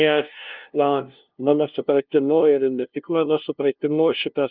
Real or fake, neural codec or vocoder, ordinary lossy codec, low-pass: fake; codec, 16 kHz, 0.5 kbps, FunCodec, trained on LibriTTS, 25 frames a second; Opus, 24 kbps; 5.4 kHz